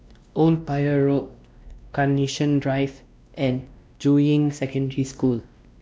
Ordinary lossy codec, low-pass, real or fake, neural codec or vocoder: none; none; fake; codec, 16 kHz, 1 kbps, X-Codec, WavLM features, trained on Multilingual LibriSpeech